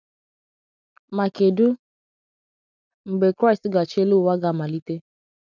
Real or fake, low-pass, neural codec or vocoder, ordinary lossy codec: real; 7.2 kHz; none; none